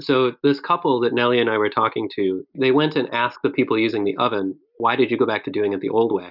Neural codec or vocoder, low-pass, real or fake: none; 5.4 kHz; real